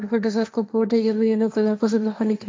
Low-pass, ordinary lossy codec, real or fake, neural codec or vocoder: none; none; fake; codec, 16 kHz, 1.1 kbps, Voila-Tokenizer